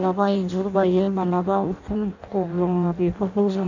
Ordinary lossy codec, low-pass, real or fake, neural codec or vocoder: none; 7.2 kHz; fake; codec, 16 kHz in and 24 kHz out, 0.6 kbps, FireRedTTS-2 codec